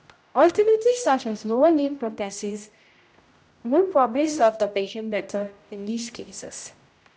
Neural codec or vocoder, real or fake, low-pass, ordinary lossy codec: codec, 16 kHz, 0.5 kbps, X-Codec, HuBERT features, trained on general audio; fake; none; none